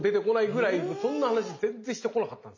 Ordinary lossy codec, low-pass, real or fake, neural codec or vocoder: MP3, 64 kbps; 7.2 kHz; real; none